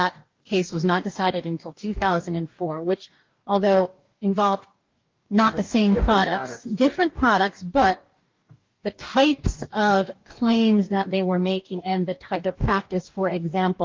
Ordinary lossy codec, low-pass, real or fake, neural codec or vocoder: Opus, 32 kbps; 7.2 kHz; fake; codec, 44.1 kHz, 2.6 kbps, DAC